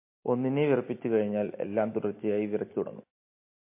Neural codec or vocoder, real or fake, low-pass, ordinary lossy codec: none; real; 3.6 kHz; MP3, 24 kbps